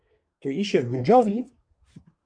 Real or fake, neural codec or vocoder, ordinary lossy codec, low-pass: fake; codec, 24 kHz, 1 kbps, SNAC; MP3, 96 kbps; 9.9 kHz